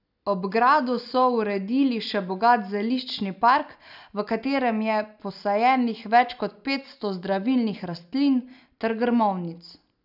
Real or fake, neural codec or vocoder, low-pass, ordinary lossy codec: real; none; 5.4 kHz; none